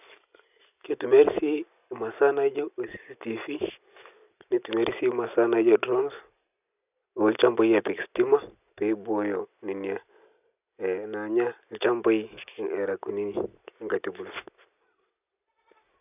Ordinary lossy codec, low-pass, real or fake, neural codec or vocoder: none; 3.6 kHz; real; none